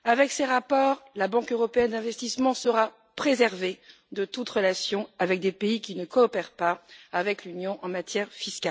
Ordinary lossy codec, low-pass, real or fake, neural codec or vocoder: none; none; real; none